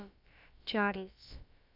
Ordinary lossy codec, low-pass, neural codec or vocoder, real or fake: none; 5.4 kHz; codec, 16 kHz, about 1 kbps, DyCAST, with the encoder's durations; fake